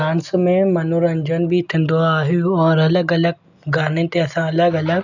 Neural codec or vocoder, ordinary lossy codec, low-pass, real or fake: none; none; 7.2 kHz; real